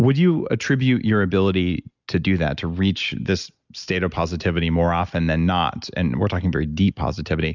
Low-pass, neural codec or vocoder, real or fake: 7.2 kHz; none; real